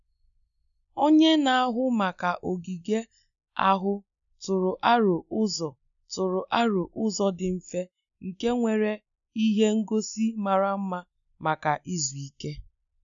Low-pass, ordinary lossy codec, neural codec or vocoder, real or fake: 7.2 kHz; none; none; real